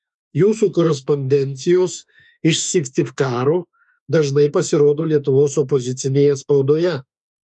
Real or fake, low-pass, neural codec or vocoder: fake; 10.8 kHz; autoencoder, 48 kHz, 32 numbers a frame, DAC-VAE, trained on Japanese speech